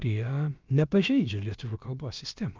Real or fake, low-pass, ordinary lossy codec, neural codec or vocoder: fake; 7.2 kHz; Opus, 24 kbps; codec, 16 kHz, about 1 kbps, DyCAST, with the encoder's durations